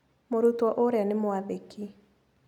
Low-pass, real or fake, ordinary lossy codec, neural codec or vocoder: 19.8 kHz; real; none; none